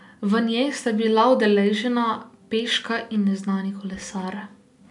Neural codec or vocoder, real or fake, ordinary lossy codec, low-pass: none; real; none; 10.8 kHz